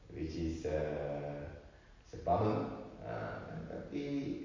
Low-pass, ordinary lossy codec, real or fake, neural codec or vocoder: 7.2 kHz; MP3, 32 kbps; real; none